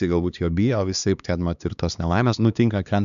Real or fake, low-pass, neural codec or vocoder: fake; 7.2 kHz; codec, 16 kHz, 2 kbps, X-Codec, HuBERT features, trained on LibriSpeech